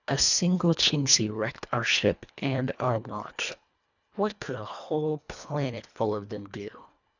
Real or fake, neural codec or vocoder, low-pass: fake; codec, 24 kHz, 1.5 kbps, HILCodec; 7.2 kHz